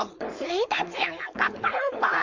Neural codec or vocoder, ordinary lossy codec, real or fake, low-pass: codec, 16 kHz, 4.8 kbps, FACodec; MP3, 48 kbps; fake; 7.2 kHz